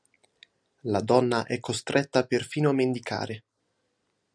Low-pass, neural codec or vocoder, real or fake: 9.9 kHz; none; real